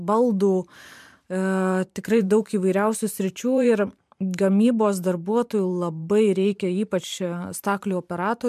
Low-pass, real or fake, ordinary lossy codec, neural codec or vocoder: 14.4 kHz; fake; MP3, 96 kbps; vocoder, 44.1 kHz, 128 mel bands every 512 samples, BigVGAN v2